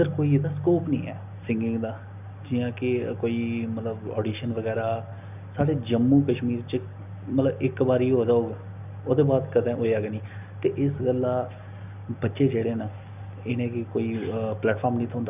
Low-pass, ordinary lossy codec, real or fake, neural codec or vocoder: 3.6 kHz; none; real; none